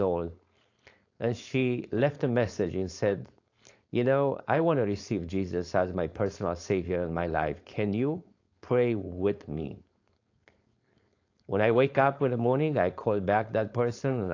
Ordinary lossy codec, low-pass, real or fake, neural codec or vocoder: AAC, 48 kbps; 7.2 kHz; fake; codec, 16 kHz, 4.8 kbps, FACodec